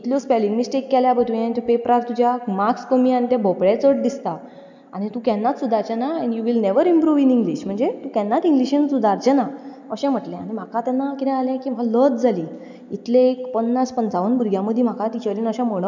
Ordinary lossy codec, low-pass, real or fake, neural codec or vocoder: none; 7.2 kHz; real; none